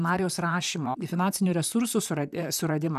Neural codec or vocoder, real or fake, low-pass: vocoder, 44.1 kHz, 128 mel bands, Pupu-Vocoder; fake; 14.4 kHz